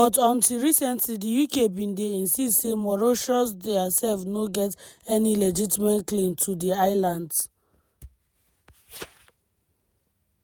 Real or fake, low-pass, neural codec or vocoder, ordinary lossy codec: fake; none; vocoder, 48 kHz, 128 mel bands, Vocos; none